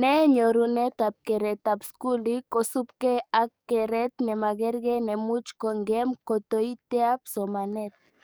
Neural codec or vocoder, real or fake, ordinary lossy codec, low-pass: codec, 44.1 kHz, 7.8 kbps, DAC; fake; none; none